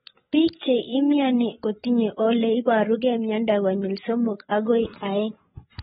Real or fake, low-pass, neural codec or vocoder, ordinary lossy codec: fake; 7.2 kHz; codec, 16 kHz, 8 kbps, FreqCodec, larger model; AAC, 16 kbps